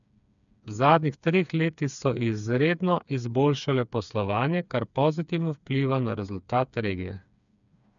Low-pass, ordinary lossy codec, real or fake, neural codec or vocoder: 7.2 kHz; none; fake; codec, 16 kHz, 4 kbps, FreqCodec, smaller model